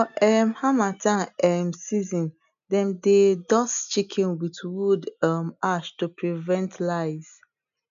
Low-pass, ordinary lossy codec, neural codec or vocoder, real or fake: 7.2 kHz; none; none; real